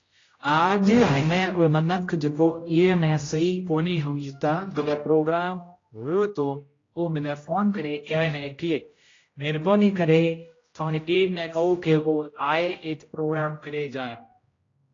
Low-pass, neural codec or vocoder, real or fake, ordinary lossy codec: 7.2 kHz; codec, 16 kHz, 0.5 kbps, X-Codec, HuBERT features, trained on general audio; fake; AAC, 32 kbps